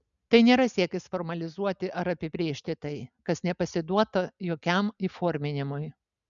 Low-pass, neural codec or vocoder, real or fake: 7.2 kHz; none; real